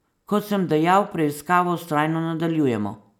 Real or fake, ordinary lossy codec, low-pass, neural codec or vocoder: real; none; 19.8 kHz; none